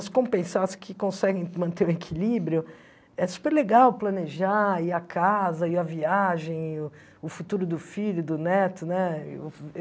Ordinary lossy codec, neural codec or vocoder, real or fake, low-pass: none; none; real; none